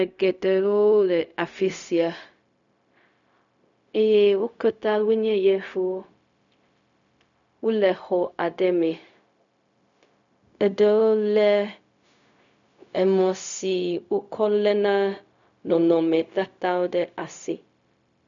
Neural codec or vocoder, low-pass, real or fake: codec, 16 kHz, 0.4 kbps, LongCat-Audio-Codec; 7.2 kHz; fake